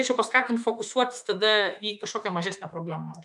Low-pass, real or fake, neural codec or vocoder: 10.8 kHz; fake; autoencoder, 48 kHz, 32 numbers a frame, DAC-VAE, trained on Japanese speech